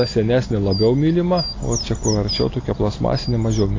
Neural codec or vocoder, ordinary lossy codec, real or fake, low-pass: none; AAC, 32 kbps; real; 7.2 kHz